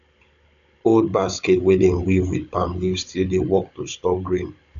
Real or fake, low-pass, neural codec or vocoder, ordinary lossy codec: fake; 7.2 kHz; codec, 16 kHz, 16 kbps, FunCodec, trained on Chinese and English, 50 frames a second; none